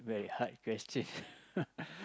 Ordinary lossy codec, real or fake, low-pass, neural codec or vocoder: none; real; none; none